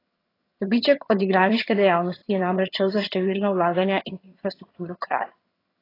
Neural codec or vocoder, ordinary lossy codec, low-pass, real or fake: vocoder, 22.05 kHz, 80 mel bands, HiFi-GAN; AAC, 24 kbps; 5.4 kHz; fake